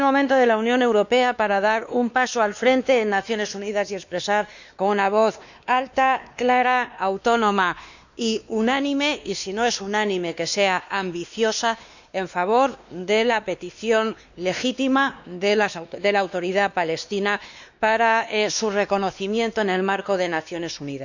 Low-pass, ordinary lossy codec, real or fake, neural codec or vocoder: 7.2 kHz; none; fake; codec, 16 kHz, 2 kbps, X-Codec, WavLM features, trained on Multilingual LibriSpeech